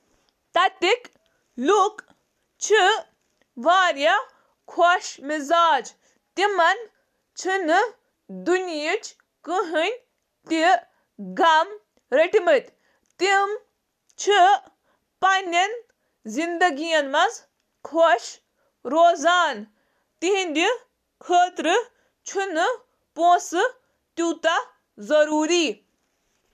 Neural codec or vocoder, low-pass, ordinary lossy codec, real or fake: none; 14.4 kHz; MP3, 96 kbps; real